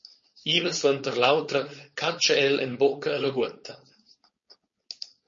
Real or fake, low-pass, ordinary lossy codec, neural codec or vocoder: fake; 7.2 kHz; MP3, 32 kbps; codec, 16 kHz, 4.8 kbps, FACodec